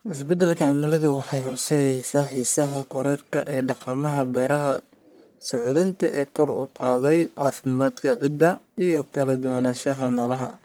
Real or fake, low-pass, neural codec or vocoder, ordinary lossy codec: fake; none; codec, 44.1 kHz, 1.7 kbps, Pupu-Codec; none